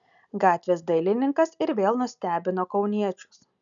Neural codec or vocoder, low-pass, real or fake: none; 7.2 kHz; real